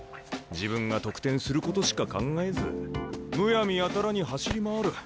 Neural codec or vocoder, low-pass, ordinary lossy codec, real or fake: none; none; none; real